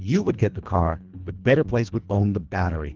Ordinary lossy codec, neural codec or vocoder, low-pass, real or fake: Opus, 32 kbps; codec, 24 kHz, 1.5 kbps, HILCodec; 7.2 kHz; fake